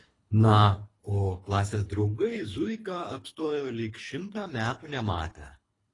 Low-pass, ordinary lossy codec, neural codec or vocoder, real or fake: 10.8 kHz; AAC, 32 kbps; codec, 24 kHz, 3 kbps, HILCodec; fake